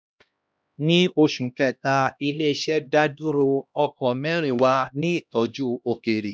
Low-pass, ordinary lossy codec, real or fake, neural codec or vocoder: none; none; fake; codec, 16 kHz, 1 kbps, X-Codec, HuBERT features, trained on LibriSpeech